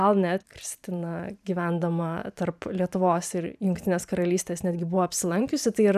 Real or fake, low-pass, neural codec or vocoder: fake; 14.4 kHz; vocoder, 44.1 kHz, 128 mel bands every 512 samples, BigVGAN v2